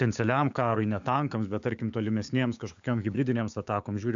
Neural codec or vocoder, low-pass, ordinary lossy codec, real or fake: codec, 16 kHz, 6 kbps, DAC; 7.2 kHz; AAC, 64 kbps; fake